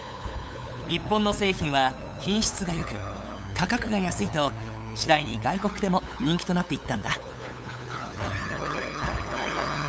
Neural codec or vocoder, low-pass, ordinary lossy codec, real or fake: codec, 16 kHz, 8 kbps, FunCodec, trained on LibriTTS, 25 frames a second; none; none; fake